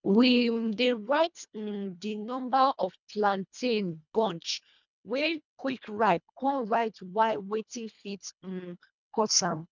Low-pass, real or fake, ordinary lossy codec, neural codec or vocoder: 7.2 kHz; fake; none; codec, 24 kHz, 1.5 kbps, HILCodec